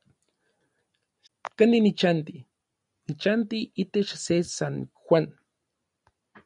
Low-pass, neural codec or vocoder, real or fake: 10.8 kHz; none; real